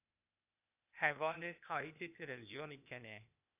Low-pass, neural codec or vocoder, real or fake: 3.6 kHz; codec, 16 kHz, 0.8 kbps, ZipCodec; fake